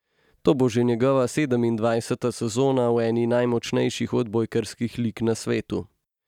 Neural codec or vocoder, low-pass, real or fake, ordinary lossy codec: none; 19.8 kHz; real; none